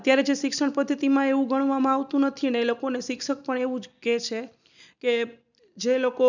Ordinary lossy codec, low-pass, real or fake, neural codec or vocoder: none; 7.2 kHz; real; none